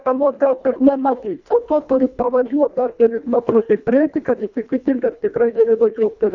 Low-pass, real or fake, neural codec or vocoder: 7.2 kHz; fake; codec, 24 kHz, 1.5 kbps, HILCodec